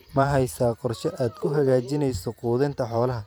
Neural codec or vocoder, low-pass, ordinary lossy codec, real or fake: vocoder, 44.1 kHz, 128 mel bands every 512 samples, BigVGAN v2; none; none; fake